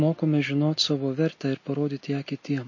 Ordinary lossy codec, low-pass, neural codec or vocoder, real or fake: MP3, 32 kbps; 7.2 kHz; none; real